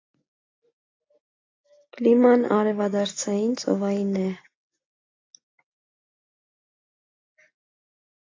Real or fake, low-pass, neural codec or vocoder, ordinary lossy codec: real; 7.2 kHz; none; AAC, 32 kbps